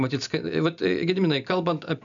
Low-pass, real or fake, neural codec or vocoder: 7.2 kHz; real; none